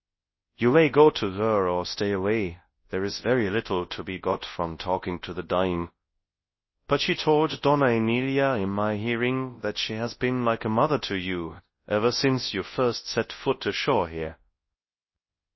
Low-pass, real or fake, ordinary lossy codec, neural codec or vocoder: 7.2 kHz; fake; MP3, 24 kbps; codec, 24 kHz, 0.9 kbps, WavTokenizer, large speech release